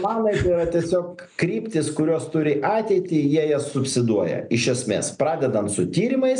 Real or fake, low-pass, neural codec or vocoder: real; 9.9 kHz; none